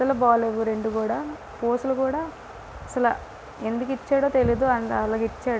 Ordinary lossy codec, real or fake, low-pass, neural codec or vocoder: none; real; none; none